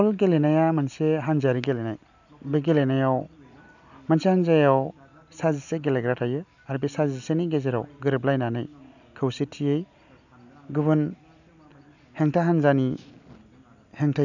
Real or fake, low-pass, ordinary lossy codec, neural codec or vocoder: real; 7.2 kHz; none; none